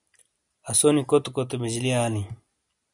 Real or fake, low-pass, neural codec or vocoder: real; 10.8 kHz; none